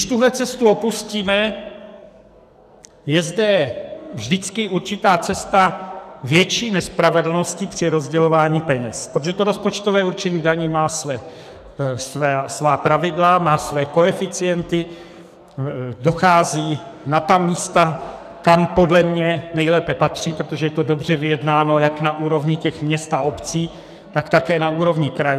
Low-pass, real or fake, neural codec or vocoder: 14.4 kHz; fake; codec, 44.1 kHz, 2.6 kbps, SNAC